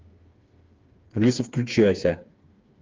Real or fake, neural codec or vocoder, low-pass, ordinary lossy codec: fake; codec, 16 kHz, 4 kbps, FreqCodec, smaller model; 7.2 kHz; Opus, 32 kbps